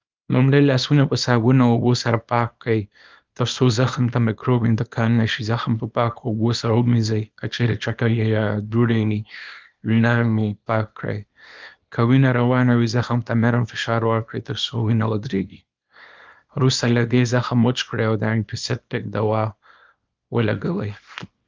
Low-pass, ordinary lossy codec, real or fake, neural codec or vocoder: 7.2 kHz; Opus, 32 kbps; fake; codec, 24 kHz, 0.9 kbps, WavTokenizer, small release